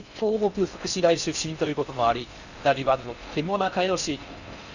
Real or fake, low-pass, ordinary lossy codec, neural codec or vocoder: fake; 7.2 kHz; none; codec, 16 kHz in and 24 kHz out, 0.6 kbps, FocalCodec, streaming, 4096 codes